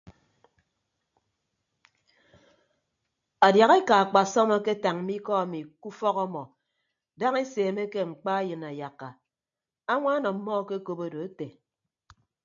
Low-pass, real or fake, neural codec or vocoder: 7.2 kHz; real; none